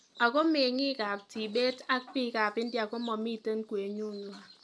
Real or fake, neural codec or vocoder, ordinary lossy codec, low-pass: real; none; none; none